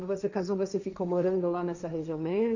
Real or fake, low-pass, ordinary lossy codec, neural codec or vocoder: fake; 7.2 kHz; none; codec, 16 kHz, 1.1 kbps, Voila-Tokenizer